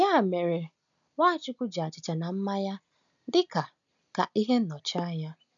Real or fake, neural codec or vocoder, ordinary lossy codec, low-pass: real; none; none; 7.2 kHz